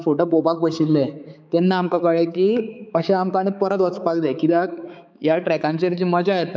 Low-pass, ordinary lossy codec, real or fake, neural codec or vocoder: none; none; fake; codec, 16 kHz, 4 kbps, X-Codec, HuBERT features, trained on balanced general audio